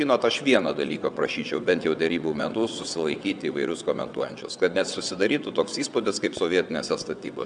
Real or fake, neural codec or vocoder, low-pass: fake; vocoder, 22.05 kHz, 80 mel bands, WaveNeXt; 9.9 kHz